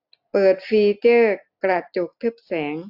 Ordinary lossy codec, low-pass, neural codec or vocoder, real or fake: AAC, 48 kbps; 5.4 kHz; none; real